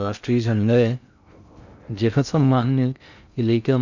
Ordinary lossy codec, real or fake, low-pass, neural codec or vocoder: none; fake; 7.2 kHz; codec, 16 kHz in and 24 kHz out, 0.6 kbps, FocalCodec, streaming, 2048 codes